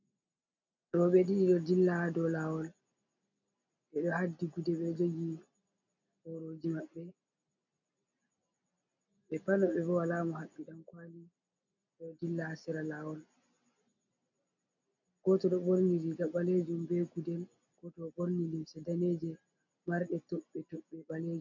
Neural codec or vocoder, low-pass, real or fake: none; 7.2 kHz; real